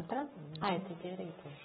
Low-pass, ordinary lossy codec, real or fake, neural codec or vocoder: 19.8 kHz; AAC, 16 kbps; fake; codec, 44.1 kHz, 7.8 kbps, Pupu-Codec